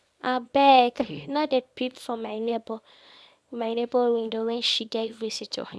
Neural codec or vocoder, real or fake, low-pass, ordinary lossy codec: codec, 24 kHz, 0.9 kbps, WavTokenizer, small release; fake; none; none